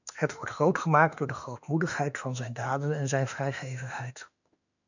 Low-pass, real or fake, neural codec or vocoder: 7.2 kHz; fake; autoencoder, 48 kHz, 32 numbers a frame, DAC-VAE, trained on Japanese speech